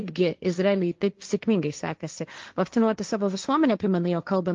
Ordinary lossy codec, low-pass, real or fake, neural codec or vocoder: Opus, 32 kbps; 7.2 kHz; fake; codec, 16 kHz, 1.1 kbps, Voila-Tokenizer